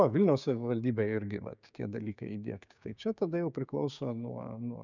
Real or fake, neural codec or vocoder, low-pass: fake; codec, 16 kHz, 6 kbps, DAC; 7.2 kHz